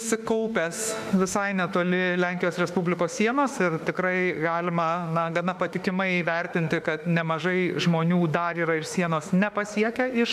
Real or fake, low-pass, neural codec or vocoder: fake; 14.4 kHz; autoencoder, 48 kHz, 32 numbers a frame, DAC-VAE, trained on Japanese speech